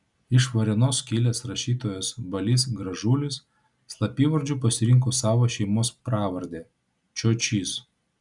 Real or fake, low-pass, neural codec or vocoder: real; 10.8 kHz; none